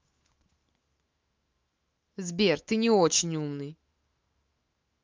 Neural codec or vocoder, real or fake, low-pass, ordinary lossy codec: autoencoder, 48 kHz, 128 numbers a frame, DAC-VAE, trained on Japanese speech; fake; 7.2 kHz; Opus, 32 kbps